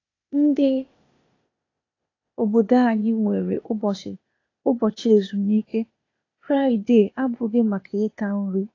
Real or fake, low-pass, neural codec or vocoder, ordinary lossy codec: fake; 7.2 kHz; codec, 16 kHz, 0.8 kbps, ZipCodec; AAC, 32 kbps